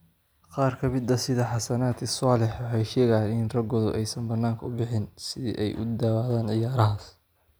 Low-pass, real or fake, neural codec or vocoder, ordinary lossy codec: none; real; none; none